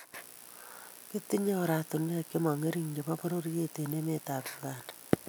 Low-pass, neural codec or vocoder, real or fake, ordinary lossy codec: none; none; real; none